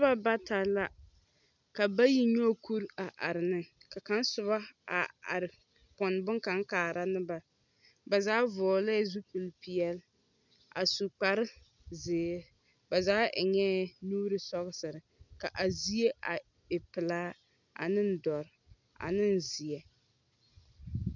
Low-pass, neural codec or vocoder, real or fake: 7.2 kHz; none; real